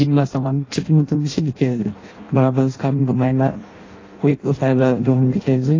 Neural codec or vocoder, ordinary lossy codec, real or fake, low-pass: codec, 16 kHz in and 24 kHz out, 0.6 kbps, FireRedTTS-2 codec; AAC, 32 kbps; fake; 7.2 kHz